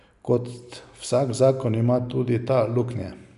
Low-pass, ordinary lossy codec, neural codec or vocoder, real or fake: 10.8 kHz; none; none; real